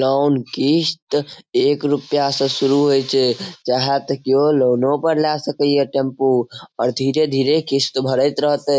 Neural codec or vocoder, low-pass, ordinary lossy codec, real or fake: none; none; none; real